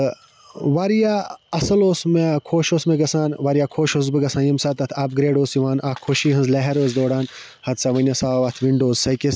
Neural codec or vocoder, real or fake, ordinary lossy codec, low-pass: none; real; none; none